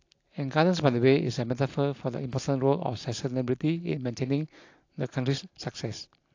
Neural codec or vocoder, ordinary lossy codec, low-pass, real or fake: none; AAC, 48 kbps; 7.2 kHz; real